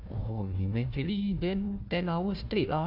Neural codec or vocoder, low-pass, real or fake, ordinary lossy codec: codec, 16 kHz, 1 kbps, FunCodec, trained on Chinese and English, 50 frames a second; 5.4 kHz; fake; MP3, 48 kbps